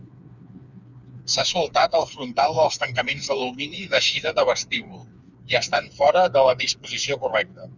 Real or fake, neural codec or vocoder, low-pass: fake; codec, 16 kHz, 4 kbps, FreqCodec, smaller model; 7.2 kHz